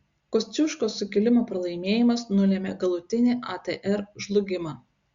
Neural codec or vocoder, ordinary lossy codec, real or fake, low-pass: none; Opus, 64 kbps; real; 7.2 kHz